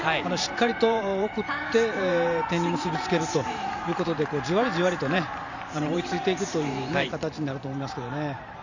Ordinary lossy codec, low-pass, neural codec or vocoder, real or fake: none; 7.2 kHz; vocoder, 44.1 kHz, 128 mel bands every 512 samples, BigVGAN v2; fake